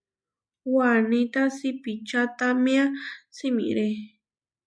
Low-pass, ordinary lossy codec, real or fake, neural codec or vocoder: 9.9 kHz; MP3, 96 kbps; real; none